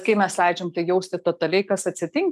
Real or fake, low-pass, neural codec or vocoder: real; 14.4 kHz; none